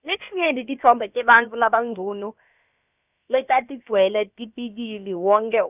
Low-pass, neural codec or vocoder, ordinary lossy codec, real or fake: 3.6 kHz; codec, 16 kHz, about 1 kbps, DyCAST, with the encoder's durations; none; fake